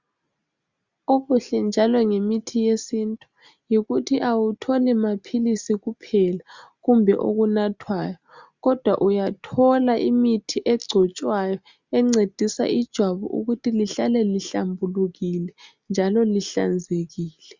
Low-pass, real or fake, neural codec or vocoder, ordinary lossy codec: 7.2 kHz; real; none; Opus, 64 kbps